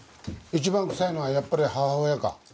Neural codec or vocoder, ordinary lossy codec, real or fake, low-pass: none; none; real; none